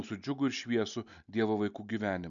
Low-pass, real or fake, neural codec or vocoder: 7.2 kHz; real; none